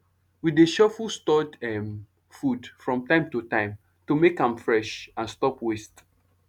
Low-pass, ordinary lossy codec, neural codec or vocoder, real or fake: 19.8 kHz; none; none; real